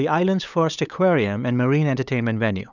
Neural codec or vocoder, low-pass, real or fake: codec, 16 kHz, 8 kbps, FunCodec, trained on LibriTTS, 25 frames a second; 7.2 kHz; fake